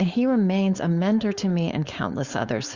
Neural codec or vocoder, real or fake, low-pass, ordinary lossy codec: codec, 16 kHz, 4.8 kbps, FACodec; fake; 7.2 kHz; Opus, 64 kbps